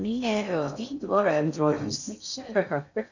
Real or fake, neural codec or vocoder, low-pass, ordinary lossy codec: fake; codec, 16 kHz in and 24 kHz out, 0.6 kbps, FocalCodec, streaming, 2048 codes; 7.2 kHz; none